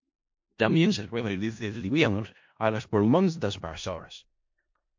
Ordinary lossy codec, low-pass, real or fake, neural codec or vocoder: MP3, 48 kbps; 7.2 kHz; fake; codec, 16 kHz in and 24 kHz out, 0.4 kbps, LongCat-Audio-Codec, four codebook decoder